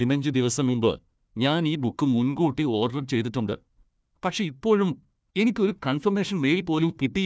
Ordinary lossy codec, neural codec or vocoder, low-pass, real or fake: none; codec, 16 kHz, 1 kbps, FunCodec, trained on Chinese and English, 50 frames a second; none; fake